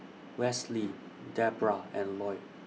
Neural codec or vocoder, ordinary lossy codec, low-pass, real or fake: none; none; none; real